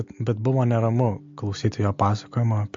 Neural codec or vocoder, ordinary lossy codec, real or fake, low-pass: none; MP3, 48 kbps; real; 7.2 kHz